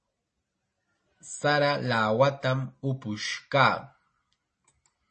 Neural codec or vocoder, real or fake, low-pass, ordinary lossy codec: none; real; 10.8 kHz; MP3, 32 kbps